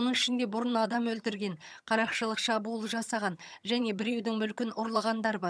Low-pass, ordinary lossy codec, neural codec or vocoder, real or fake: none; none; vocoder, 22.05 kHz, 80 mel bands, HiFi-GAN; fake